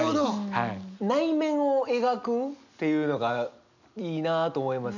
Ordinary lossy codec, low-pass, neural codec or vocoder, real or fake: none; 7.2 kHz; none; real